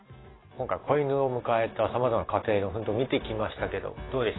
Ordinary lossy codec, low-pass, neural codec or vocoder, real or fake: AAC, 16 kbps; 7.2 kHz; none; real